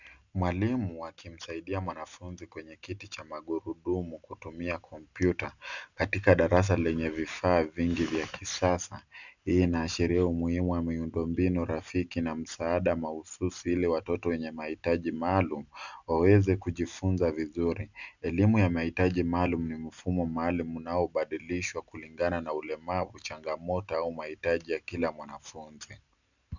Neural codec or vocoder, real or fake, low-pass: none; real; 7.2 kHz